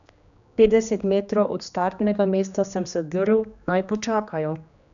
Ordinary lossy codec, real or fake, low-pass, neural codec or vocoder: none; fake; 7.2 kHz; codec, 16 kHz, 2 kbps, X-Codec, HuBERT features, trained on general audio